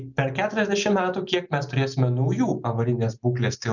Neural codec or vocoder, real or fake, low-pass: none; real; 7.2 kHz